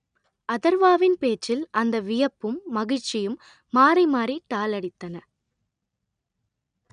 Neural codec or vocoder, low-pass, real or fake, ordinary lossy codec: none; 9.9 kHz; real; none